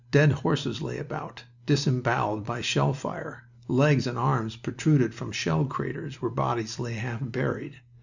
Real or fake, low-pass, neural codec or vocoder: real; 7.2 kHz; none